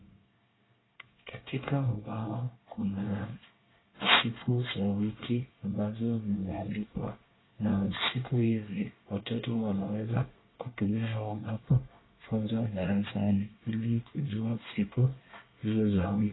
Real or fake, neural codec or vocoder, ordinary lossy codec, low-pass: fake; codec, 24 kHz, 1 kbps, SNAC; AAC, 16 kbps; 7.2 kHz